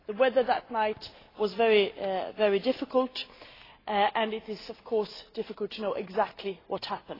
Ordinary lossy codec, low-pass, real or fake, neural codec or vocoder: AAC, 24 kbps; 5.4 kHz; real; none